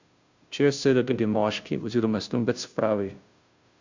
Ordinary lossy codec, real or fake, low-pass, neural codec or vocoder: Opus, 64 kbps; fake; 7.2 kHz; codec, 16 kHz, 0.5 kbps, FunCodec, trained on Chinese and English, 25 frames a second